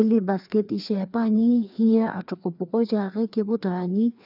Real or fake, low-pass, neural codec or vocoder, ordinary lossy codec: fake; 5.4 kHz; codec, 16 kHz, 2 kbps, FreqCodec, larger model; none